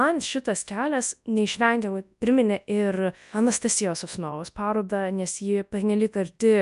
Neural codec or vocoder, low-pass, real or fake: codec, 24 kHz, 0.9 kbps, WavTokenizer, large speech release; 10.8 kHz; fake